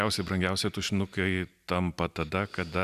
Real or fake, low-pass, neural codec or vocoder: real; 14.4 kHz; none